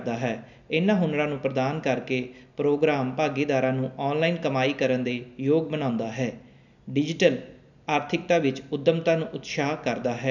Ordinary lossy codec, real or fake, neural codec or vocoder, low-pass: none; real; none; 7.2 kHz